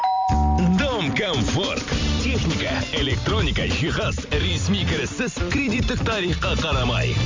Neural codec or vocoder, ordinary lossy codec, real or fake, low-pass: vocoder, 44.1 kHz, 128 mel bands every 256 samples, BigVGAN v2; MP3, 64 kbps; fake; 7.2 kHz